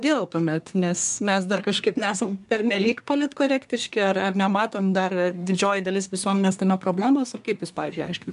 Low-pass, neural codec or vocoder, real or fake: 10.8 kHz; codec, 24 kHz, 1 kbps, SNAC; fake